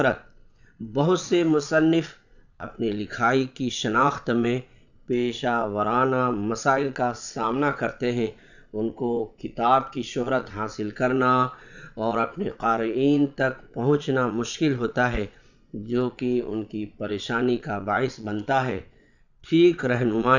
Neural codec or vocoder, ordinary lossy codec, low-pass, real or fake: vocoder, 22.05 kHz, 80 mel bands, Vocos; none; 7.2 kHz; fake